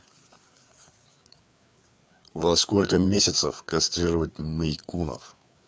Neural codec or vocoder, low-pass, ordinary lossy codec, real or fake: codec, 16 kHz, 4 kbps, FreqCodec, larger model; none; none; fake